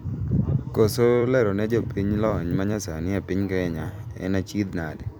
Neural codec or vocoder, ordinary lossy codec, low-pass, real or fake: vocoder, 44.1 kHz, 128 mel bands every 256 samples, BigVGAN v2; none; none; fake